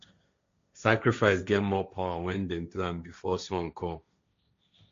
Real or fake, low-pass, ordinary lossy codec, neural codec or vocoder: fake; 7.2 kHz; MP3, 48 kbps; codec, 16 kHz, 1.1 kbps, Voila-Tokenizer